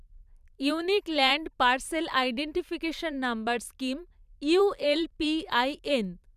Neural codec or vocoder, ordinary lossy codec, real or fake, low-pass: vocoder, 44.1 kHz, 128 mel bands every 512 samples, BigVGAN v2; none; fake; 14.4 kHz